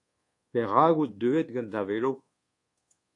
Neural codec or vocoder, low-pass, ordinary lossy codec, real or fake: codec, 24 kHz, 1.2 kbps, DualCodec; 10.8 kHz; AAC, 48 kbps; fake